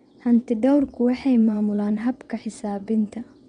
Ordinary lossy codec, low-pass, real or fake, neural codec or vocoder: MP3, 64 kbps; 9.9 kHz; fake; vocoder, 22.05 kHz, 80 mel bands, WaveNeXt